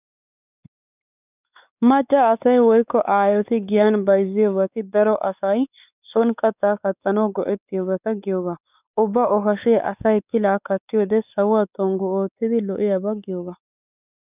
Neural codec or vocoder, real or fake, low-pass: codec, 16 kHz, 4 kbps, X-Codec, WavLM features, trained on Multilingual LibriSpeech; fake; 3.6 kHz